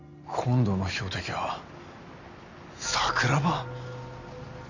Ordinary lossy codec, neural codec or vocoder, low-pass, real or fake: none; none; 7.2 kHz; real